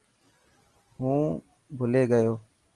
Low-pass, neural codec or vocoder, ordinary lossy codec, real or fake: 10.8 kHz; none; Opus, 24 kbps; real